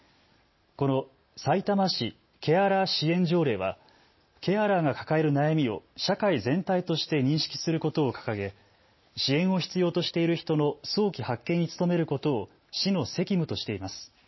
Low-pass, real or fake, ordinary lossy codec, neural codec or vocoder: 7.2 kHz; real; MP3, 24 kbps; none